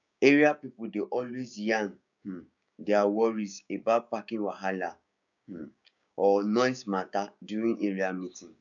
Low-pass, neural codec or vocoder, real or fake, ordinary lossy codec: 7.2 kHz; codec, 16 kHz, 6 kbps, DAC; fake; none